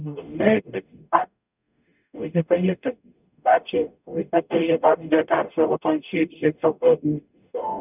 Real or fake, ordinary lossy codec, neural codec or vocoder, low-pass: fake; none; codec, 44.1 kHz, 0.9 kbps, DAC; 3.6 kHz